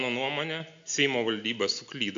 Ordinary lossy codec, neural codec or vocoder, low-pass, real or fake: AAC, 64 kbps; none; 7.2 kHz; real